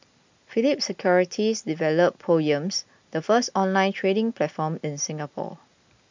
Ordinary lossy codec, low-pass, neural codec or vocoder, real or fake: MP3, 64 kbps; 7.2 kHz; none; real